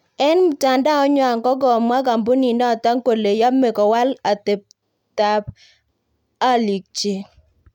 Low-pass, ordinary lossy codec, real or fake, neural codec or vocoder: 19.8 kHz; none; real; none